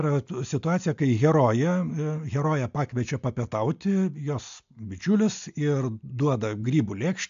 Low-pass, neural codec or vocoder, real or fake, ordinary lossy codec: 7.2 kHz; none; real; AAC, 64 kbps